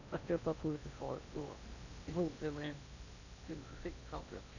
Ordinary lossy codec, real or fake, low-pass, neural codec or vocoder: none; fake; 7.2 kHz; codec, 16 kHz in and 24 kHz out, 0.6 kbps, FocalCodec, streaming, 4096 codes